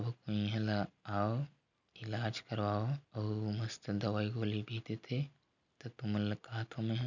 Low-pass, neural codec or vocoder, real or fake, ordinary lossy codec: 7.2 kHz; none; real; none